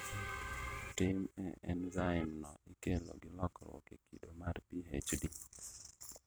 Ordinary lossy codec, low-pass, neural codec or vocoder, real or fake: none; none; vocoder, 44.1 kHz, 128 mel bands every 256 samples, BigVGAN v2; fake